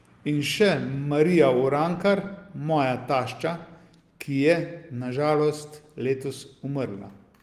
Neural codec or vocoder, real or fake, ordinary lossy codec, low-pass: none; real; Opus, 24 kbps; 14.4 kHz